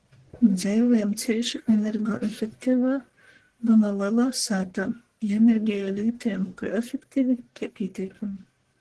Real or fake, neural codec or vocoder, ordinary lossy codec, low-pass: fake; codec, 44.1 kHz, 1.7 kbps, Pupu-Codec; Opus, 16 kbps; 10.8 kHz